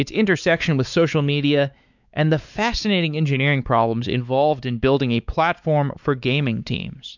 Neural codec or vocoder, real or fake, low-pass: codec, 16 kHz, 4 kbps, X-Codec, WavLM features, trained on Multilingual LibriSpeech; fake; 7.2 kHz